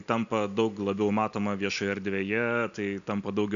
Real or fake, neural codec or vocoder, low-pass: real; none; 7.2 kHz